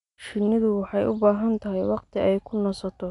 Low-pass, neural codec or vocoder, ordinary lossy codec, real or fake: 10.8 kHz; none; none; real